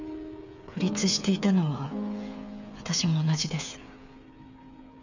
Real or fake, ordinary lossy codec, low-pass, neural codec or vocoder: fake; none; 7.2 kHz; codec, 16 kHz, 8 kbps, FreqCodec, smaller model